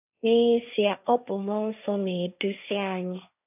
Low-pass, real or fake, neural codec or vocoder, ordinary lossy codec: 3.6 kHz; fake; codec, 16 kHz, 1.1 kbps, Voila-Tokenizer; none